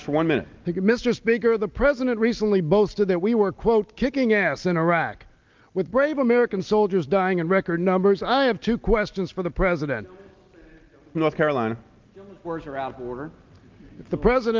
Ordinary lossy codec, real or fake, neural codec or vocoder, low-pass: Opus, 24 kbps; real; none; 7.2 kHz